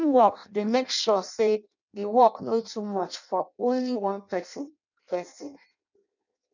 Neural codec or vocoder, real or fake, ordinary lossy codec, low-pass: codec, 16 kHz in and 24 kHz out, 0.6 kbps, FireRedTTS-2 codec; fake; none; 7.2 kHz